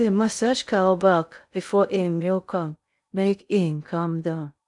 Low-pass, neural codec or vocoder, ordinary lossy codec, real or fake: 10.8 kHz; codec, 16 kHz in and 24 kHz out, 0.6 kbps, FocalCodec, streaming, 2048 codes; none; fake